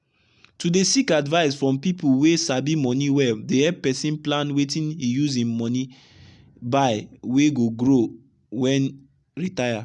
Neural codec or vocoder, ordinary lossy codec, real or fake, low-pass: none; none; real; 10.8 kHz